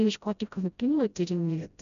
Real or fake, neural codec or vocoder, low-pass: fake; codec, 16 kHz, 0.5 kbps, FreqCodec, smaller model; 7.2 kHz